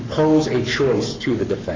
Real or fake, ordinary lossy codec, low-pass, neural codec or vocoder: fake; MP3, 64 kbps; 7.2 kHz; codec, 44.1 kHz, 7.8 kbps, Pupu-Codec